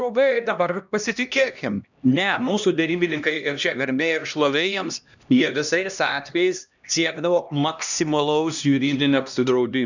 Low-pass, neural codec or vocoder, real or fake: 7.2 kHz; codec, 16 kHz, 1 kbps, X-Codec, HuBERT features, trained on LibriSpeech; fake